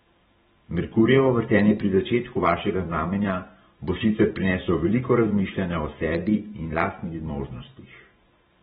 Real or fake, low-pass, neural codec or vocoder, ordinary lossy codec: fake; 19.8 kHz; vocoder, 44.1 kHz, 128 mel bands every 512 samples, BigVGAN v2; AAC, 16 kbps